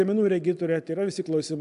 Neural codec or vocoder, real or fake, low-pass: none; real; 10.8 kHz